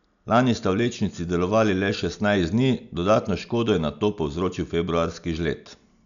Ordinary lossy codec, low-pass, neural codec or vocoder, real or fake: none; 7.2 kHz; none; real